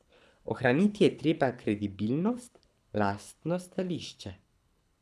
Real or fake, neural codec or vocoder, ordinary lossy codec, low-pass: fake; codec, 24 kHz, 6 kbps, HILCodec; none; none